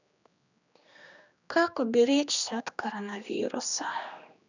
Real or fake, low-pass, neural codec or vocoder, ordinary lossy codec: fake; 7.2 kHz; codec, 16 kHz, 2 kbps, X-Codec, HuBERT features, trained on general audio; none